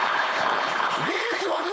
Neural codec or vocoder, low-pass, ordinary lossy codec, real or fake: codec, 16 kHz, 4.8 kbps, FACodec; none; none; fake